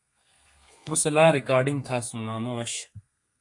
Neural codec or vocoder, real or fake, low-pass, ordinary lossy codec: codec, 32 kHz, 1.9 kbps, SNAC; fake; 10.8 kHz; AAC, 64 kbps